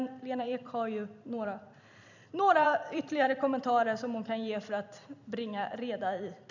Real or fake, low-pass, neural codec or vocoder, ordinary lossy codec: fake; 7.2 kHz; vocoder, 44.1 kHz, 128 mel bands every 256 samples, BigVGAN v2; none